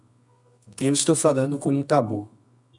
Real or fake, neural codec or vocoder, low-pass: fake; codec, 24 kHz, 0.9 kbps, WavTokenizer, medium music audio release; 10.8 kHz